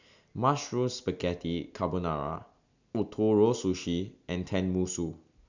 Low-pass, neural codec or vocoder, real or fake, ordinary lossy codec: 7.2 kHz; none; real; none